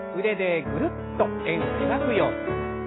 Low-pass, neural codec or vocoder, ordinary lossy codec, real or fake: 7.2 kHz; none; AAC, 16 kbps; real